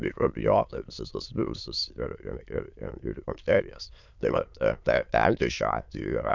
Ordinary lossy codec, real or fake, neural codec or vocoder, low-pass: MP3, 64 kbps; fake; autoencoder, 22.05 kHz, a latent of 192 numbers a frame, VITS, trained on many speakers; 7.2 kHz